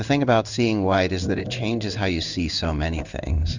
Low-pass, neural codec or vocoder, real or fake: 7.2 kHz; codec, 16 kHz in and 24 kHz out, 1 kbps, XY-Tokenizer; fake